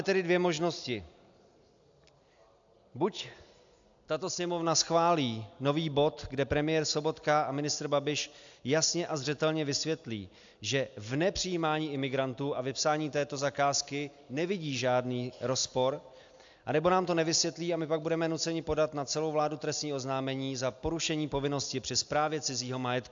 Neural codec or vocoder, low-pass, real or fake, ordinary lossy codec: none; 7.2 kHz; real; AAC, 64 kbps